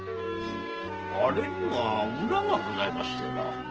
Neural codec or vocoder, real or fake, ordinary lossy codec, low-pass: codec, 16 kHz, 6 kbps, DAC; fake; Opus, 16 kbps; 7.2 kHz